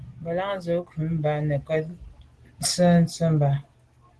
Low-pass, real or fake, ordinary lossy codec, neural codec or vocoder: 10.8 kHz; real; Opus, 16 kbps; none